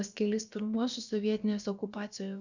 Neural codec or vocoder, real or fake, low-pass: codec, 16 kHz, about 1 kbps, DyCAST, with the encoder's durations; fake; 7.2 kHz